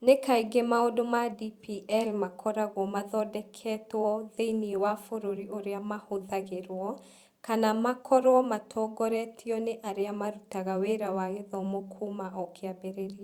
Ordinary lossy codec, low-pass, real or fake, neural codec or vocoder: Opus, 64 kbps; 19.8 kHz; fake; vocoder, 44.1 kHz, 128 mel bands every 512 samples, BigVGAN v2